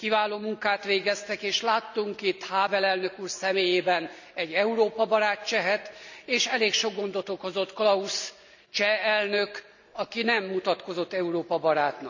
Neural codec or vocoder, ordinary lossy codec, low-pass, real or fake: none; none; 7.2 kHz; real